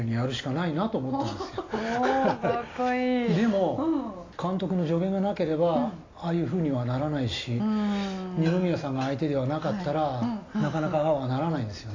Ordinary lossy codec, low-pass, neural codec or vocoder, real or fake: AAC, 32 kbps; 7.2 kHz; none; real